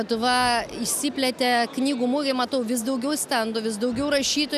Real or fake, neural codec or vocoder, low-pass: real; none; 14.4 kHz